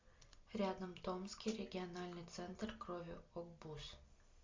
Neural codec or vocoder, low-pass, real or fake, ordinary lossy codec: none; 7.2 kHz; real; MP3, 64 kbps